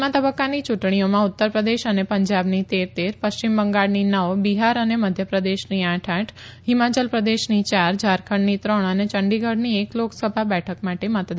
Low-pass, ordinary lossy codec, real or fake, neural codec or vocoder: none; none; real; none